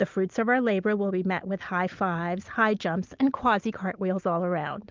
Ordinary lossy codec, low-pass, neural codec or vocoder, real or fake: Opus, 24 kbps; 7.2 kHz; none; real